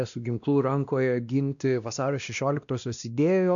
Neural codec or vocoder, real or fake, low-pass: codec, 16 kHz, 1 kbps, X-Codec, WavLM features, trained on Multilingual LibriSpeech; fake; 7.2 kHz